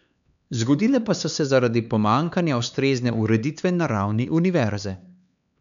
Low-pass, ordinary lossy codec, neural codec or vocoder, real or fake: 7.2 kHz; none; codec, 16 kHz, 4 kbps, X-Codec, HuBERT features, trained on LibriSpeech; fake